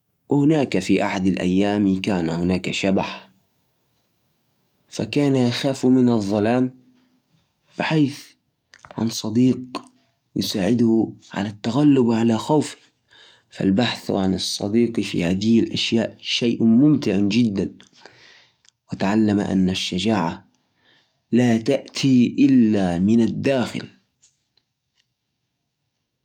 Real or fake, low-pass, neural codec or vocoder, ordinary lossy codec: fake; 19.8 kHz; codec, 44.1 kHz, 7.8 kbps, DAC; none